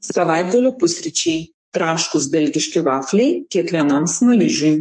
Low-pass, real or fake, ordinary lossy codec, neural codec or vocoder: 9.9 kHz; fake; MP3, 48 kbps; codec, 44.1 kHz, 2.6 kbps, SNAC